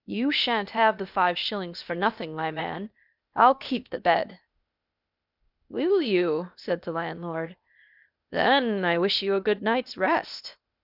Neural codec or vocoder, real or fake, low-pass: codec, 16 kHz, 0.8 kbps, ZipCodec; fake; 5.4 kHz